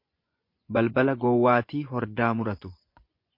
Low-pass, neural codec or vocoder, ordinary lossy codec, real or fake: 5.4 kHz; vocoder, 24 kHz, 100 mel bands, Vocos; MP3, 32 kbps; fake